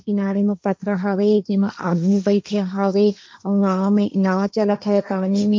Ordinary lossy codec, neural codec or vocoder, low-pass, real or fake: none; codec, 16 kHz, 1.1 kbps, Voila-Tokenizer; none; fake